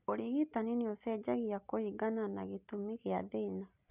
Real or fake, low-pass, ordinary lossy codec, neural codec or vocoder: real; 3.6 kHz; none; none